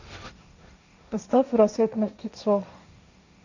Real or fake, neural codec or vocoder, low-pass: fake; codec, 16 kHz, 1.1 kbps, Voila-Tokenizer; 7.2 kHz